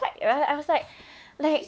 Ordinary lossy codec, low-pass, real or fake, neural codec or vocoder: none; none; fake; codec, 16 kHz, 4 kbps, X-Codec, WavLM features, trained on Multilingual LibriSpeech